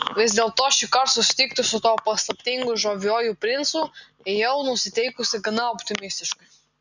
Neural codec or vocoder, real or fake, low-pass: none; real; 7.2 kHz